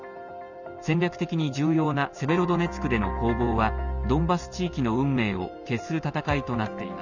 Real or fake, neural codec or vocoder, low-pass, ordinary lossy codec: real; none; 7.2 kHz; none